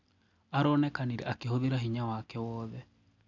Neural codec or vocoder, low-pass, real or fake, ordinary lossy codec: none; 7.2 kHz; real; none